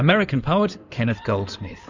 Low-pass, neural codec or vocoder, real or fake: 7.2 kHz; codec, 16 kHz in and 24 kHz out, 2.2 kbps, FireRedTTS-2 codec; fake